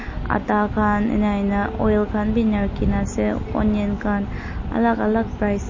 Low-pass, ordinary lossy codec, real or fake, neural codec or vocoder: 7.2 kHz; MP3, 32 kbps; real; none